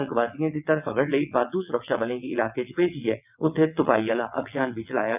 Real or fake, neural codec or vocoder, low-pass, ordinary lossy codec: fake; vocoder, 22.05 kHz, 80 mel bands, WaveNeXt; 3.6 kHz; none